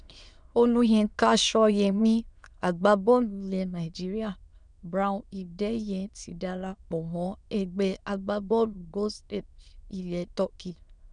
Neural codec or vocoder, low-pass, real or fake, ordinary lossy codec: autoencoder, 22.05 kHz, a latent of 192 numbers a frame, VITS, trained on many speakers; 9.9 kHz; fake; none